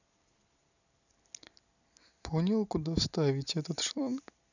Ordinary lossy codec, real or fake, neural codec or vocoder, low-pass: none; real; none; 7.2 kHz